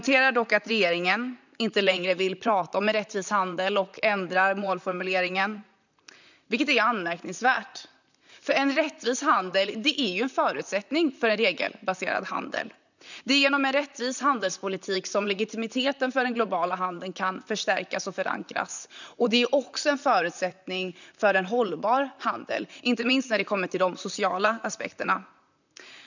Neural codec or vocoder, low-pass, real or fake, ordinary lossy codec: vocoder, 44.1 kHz, 128 mel bands, Pupu-Vocoder; 7.2 kHz; fake; none